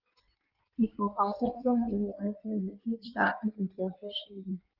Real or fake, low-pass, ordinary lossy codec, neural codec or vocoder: fake; 5.4 kHz; Opus, 24 kbps; codec, 16 kHz in and 24 kHz out, 1.1 kbps, FireRedTTS-2 codec